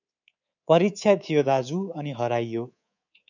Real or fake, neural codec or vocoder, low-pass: fake; codec, 24 kHz, 3.1 kbps, DualCodec; 7.2 kHz